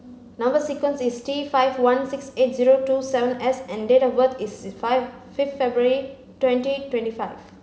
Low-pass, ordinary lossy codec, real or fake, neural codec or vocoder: none; none; real; none